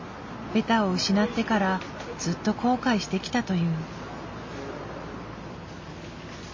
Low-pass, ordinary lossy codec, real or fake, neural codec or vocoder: 7.2 kHz; MP3, 32 kbps; real; none